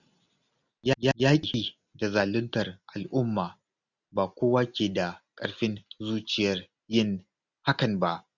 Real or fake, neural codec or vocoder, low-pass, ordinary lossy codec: real; none; 7.2 kHz; none